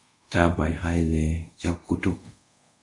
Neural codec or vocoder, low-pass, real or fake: codec, 24 kHz, 0.5 kbps, DualCodec; 10.8 kHz; fake